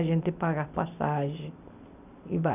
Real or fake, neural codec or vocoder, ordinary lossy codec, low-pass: real; none; none; 3.6 kHz